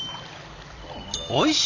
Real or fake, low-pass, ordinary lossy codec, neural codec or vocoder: real; 7.2 kHz; AAC, 48 kbps; none